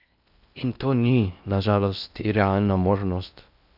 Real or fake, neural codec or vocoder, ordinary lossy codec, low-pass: fake; codec, 16 kHz in and 24 kHz out, 0.6 kbps, FocalCodec, streaming, 4096 codes; none; 5.4 kHz